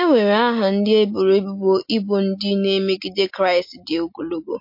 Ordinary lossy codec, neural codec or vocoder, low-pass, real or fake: MP3, 32 kbps; none; 5.4 kHz; real